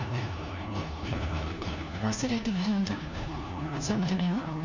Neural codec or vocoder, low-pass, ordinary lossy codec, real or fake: codec, 16 kHz, 1 kbps, FunCodec, trained on LibriTTS, 50 frames a second; 7.2 kHz; none; fake